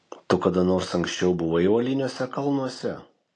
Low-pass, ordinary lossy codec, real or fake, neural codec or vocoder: 9.9 kHz; AAC, 48 kbps; real; none